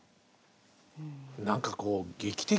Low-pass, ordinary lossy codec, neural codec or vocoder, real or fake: none; none; none; real